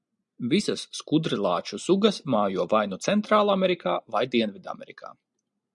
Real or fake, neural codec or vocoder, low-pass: real; none; 10.8 kHz